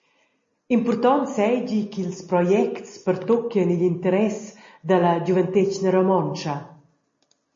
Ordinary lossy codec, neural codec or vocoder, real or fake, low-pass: MP3, 32 kbps; none; real; 7.2 kHz